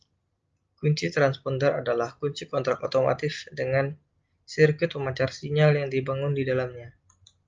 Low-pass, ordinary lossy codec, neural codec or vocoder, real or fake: 7.2 kHz; Opus, 24 kbps; none; real